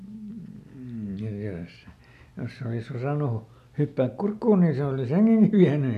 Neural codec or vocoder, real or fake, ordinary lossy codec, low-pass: none; real; none; 14.4 kHz